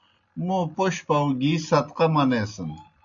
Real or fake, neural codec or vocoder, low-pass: real; none; 7.2 kHz